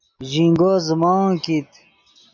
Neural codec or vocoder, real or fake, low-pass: none; real; 7.2 kHz